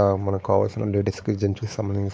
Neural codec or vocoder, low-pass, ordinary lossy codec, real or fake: codec, 16 kHz, 4 kbps, X-Codec, WavLM features, trained on Multilingual LibriSpeech; none; none; fake